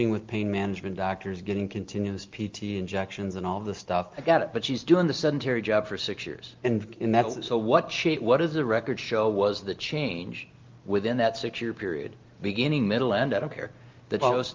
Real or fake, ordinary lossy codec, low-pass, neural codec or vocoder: real; Opus, 24 kbps; 7.2 kHz; none